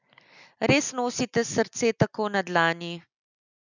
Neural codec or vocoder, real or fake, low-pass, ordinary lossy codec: none; real; 7.2 kHz; none